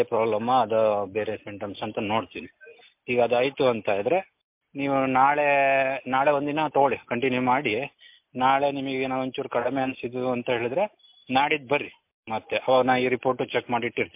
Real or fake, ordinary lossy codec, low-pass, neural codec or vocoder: real; MP3, 32 kbps; 3.6 kHz; none